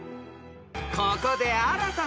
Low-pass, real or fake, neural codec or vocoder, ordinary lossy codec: none; real; none; none